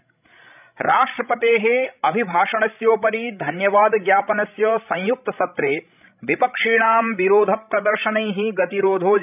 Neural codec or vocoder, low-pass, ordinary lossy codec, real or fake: codec, 16 kHz, 16 kbps, FreqCodec, larger model; 3.6 kHz; none; fake